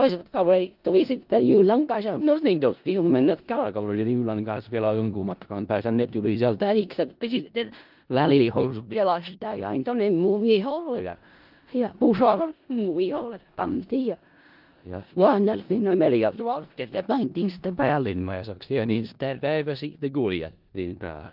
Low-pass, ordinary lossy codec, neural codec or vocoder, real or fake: 5.4 kHz; Opus, 24 kbps; codec, 16 kHz in and 24 kHz out, 0.4 kbps, LongCat-Audio-Codec, four codebook decoder; fake